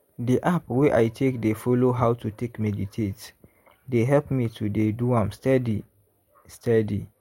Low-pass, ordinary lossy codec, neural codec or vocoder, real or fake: 19.8 kHz; MP3, 64 kbps; none; real